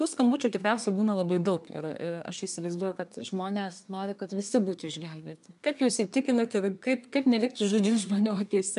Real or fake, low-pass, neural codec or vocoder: fake; 10.8 kHz; codec, 24 kHz, 1 kbps, SNAC